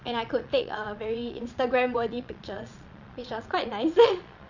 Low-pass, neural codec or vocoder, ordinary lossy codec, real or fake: 7.2 kHz; vocoder, 22.05 kHz, 80 mel bands, Vocos; none; fake